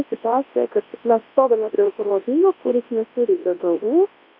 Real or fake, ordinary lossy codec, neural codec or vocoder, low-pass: fake; MP3, 32 kbps; codec, 24 kHz, 0.9 kbps, WavTokenizer, large speech release; 5.4 kHz